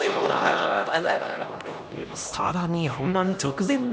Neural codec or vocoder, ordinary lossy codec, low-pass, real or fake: codec, 16 kHz, 1 kbps, X-Codec, HuBERT features, trained on LibriSpeech; none; none; fake